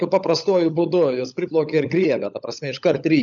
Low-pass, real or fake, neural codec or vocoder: 7.2 kHz; fake; codec, 16 kHz, 16 kbps, FunCodec, trained on LibriTTS, 50 frames a second